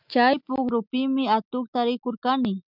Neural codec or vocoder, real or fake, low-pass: none; real; 5.4 kHz